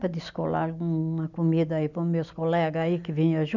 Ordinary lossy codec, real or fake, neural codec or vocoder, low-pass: none; real; none; 7.2 kHz